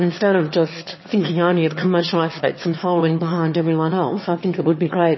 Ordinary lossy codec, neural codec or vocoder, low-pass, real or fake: MP3, 24 kbps; autoencoder, 22.05 kHz, a latent of 192 numbers a frame, VITS, trained on one speaker; 7.2 kHz; fake